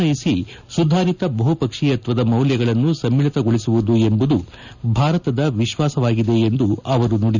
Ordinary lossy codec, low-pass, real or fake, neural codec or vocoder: none; 7.2 kHz; real; none